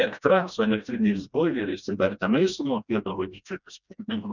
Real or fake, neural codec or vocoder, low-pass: fake; codec, 16 kHz, 1 kbps, FreqCodec, smaller model; 7.2 kHz